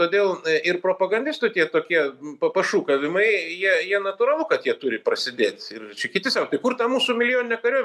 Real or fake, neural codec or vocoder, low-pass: real; none; 14.4 kHz